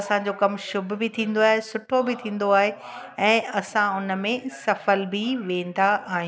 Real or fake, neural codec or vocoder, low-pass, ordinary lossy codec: real; none; none; none